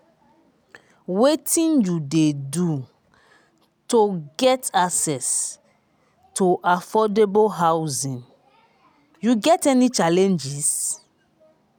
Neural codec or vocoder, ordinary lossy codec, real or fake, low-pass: none; none; real; none